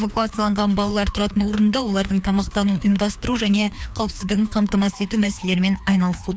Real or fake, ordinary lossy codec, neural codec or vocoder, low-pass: fake; none; codec, 16 kHz, 2 kbps, FreqCodec, larger model; none